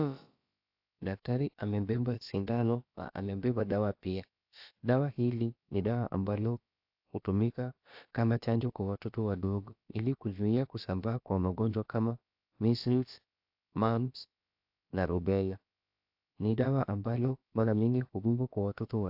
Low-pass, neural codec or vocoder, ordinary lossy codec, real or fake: 5.4 kHz; codec, 16 kHz, about 1 kbps, DyCAST, with the encoder's durations; AAC, 48 kbps; fake